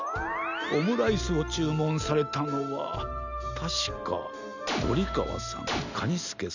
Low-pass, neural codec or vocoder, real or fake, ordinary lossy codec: 7.2 kHz; none; real; none